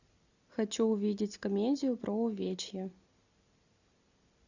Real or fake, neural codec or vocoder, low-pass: real; none; 7.2 kHz